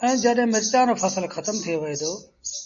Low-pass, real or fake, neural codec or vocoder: 7.2 kHz; real; none